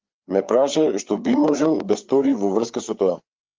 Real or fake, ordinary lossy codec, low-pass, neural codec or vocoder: fake; Opus, 32 kbps; 7.2 kHz; codec, 16 kHz, 4 kbps, FreqCodec, larger model